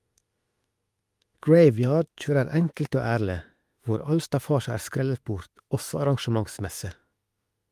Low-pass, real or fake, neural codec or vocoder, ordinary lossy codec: 14.4 kHz; fake; autoencoder, 48 kHz, 32 numbers a frame, DAC-VAE, trained on Japanese speech; Opus, 32 kbps